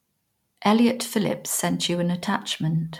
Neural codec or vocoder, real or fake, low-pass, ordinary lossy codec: none; real; 19.8 kHz; MP3, 96 kbps